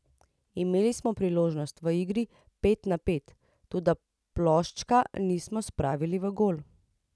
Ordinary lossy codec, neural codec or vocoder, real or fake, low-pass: none; none; real; none